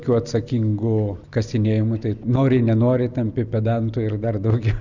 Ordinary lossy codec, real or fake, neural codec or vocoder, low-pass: Opus, 64 kbps; real; none; 7.2 kHz